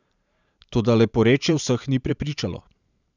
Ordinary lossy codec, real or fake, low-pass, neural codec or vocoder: none; fake; 7.2 kHz; vocoder, 44.1 kHz, 128 mel bands every 512 samples, BigVGAN v2